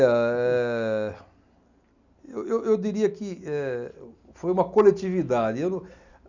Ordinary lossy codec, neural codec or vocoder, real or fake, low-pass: none; none; real; 7.2 kHz